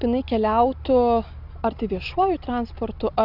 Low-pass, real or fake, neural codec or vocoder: 5.4 kHz; real; none